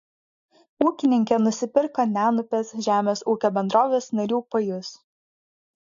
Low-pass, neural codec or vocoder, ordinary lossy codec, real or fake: 7.2 kHz; none; AAC, 64 kbps; real